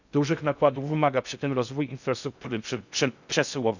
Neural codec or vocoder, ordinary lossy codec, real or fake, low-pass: codec, 16 kHz in and 24 kHz out, 0.6 kbps, FocalCodec, streaming, 2048 codes; none; fake; 7.2 kHz